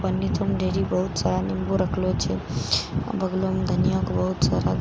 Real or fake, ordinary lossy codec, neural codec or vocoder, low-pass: real; none; none; none